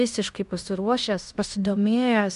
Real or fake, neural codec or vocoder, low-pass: fake; codec, 16 kHz in and 24 kHz out, 0.9 kbps, LongCat-Audio-Codec, fine tuned four codebook decoder; 10.8 kHz